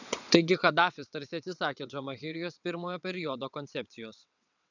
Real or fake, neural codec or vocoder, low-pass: fake; vocoder, 22.05 kHz, 80 mel bands, WaveNeXt; 7.2 kHz